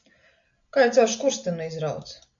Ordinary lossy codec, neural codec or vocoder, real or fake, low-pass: AAC, 64 kbps; none; real; 7.2 kHz